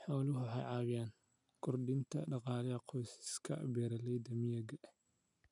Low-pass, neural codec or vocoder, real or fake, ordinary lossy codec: 10.8 kHz; none; real; none